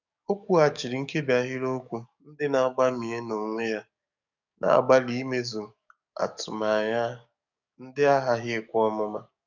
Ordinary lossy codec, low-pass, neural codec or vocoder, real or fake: none; 7.2 kHz; codec, 44.1 kHz, 7.8 kbps, DAC; fake